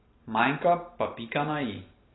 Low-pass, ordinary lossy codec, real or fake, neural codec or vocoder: 7.2 kHz; AAC, 16 kbps; real; none